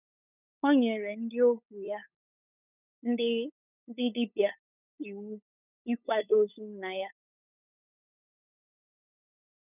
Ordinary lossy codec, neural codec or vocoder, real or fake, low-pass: none; codec, 16 kHz, 8 kbps, FunCodec, trained on LibriTTS, 25 frames a second; fake; 3.6 kHz